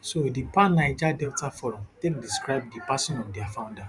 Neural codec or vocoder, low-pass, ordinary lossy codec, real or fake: vocoder, 44.1 kHz, 128 mel bands every 512 samples, BigVGAN v2; 10.8 kHz; Opus, 64 kbps; fake